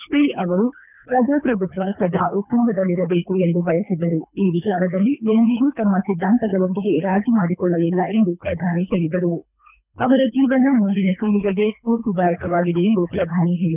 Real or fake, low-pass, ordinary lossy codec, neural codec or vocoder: fake; 3.6 kHz; none; codec, 24 kHz, 3 kbps, HILCodec